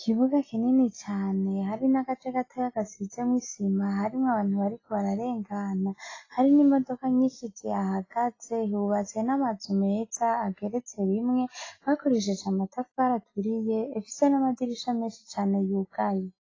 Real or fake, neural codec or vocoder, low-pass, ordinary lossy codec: real; none; 7.2 kHz; AAC, 32 kbps